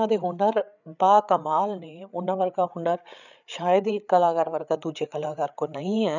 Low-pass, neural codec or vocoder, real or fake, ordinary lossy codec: 7.2 kHz; codec, 16 kHz, 8 kbps, FreqCodec, larger model; fake; none